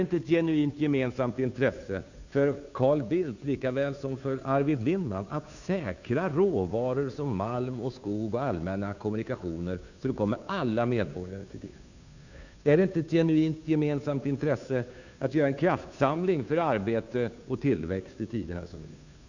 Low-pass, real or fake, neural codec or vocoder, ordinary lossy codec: 7.2 kHz; fake; codec, 16 kHz, 2 kbps, FunCodec, trained on Chinese and English, 25 frames a second; none